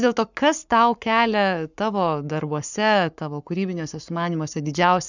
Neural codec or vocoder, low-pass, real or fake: codec, 44.1 kHz, 7.8 kbps, Pupu-Codec; 7.2 kHz; fake